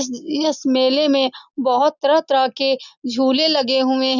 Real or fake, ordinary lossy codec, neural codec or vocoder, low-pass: real; none; none; 7.2 kHz